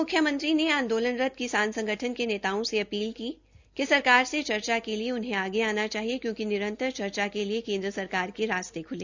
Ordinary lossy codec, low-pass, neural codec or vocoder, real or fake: Opus, 64 kbps; 7.2 kHz; none; real